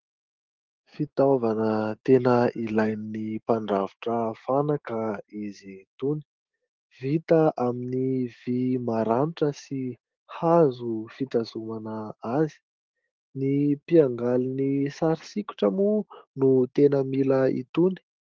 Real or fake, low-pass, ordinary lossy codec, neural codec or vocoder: fake; 7.2 kHz; Opus, 16 kbps; autoencoder, 48 kHz, 128 numbers a frame, DAC-VAE, trained on Japanese speech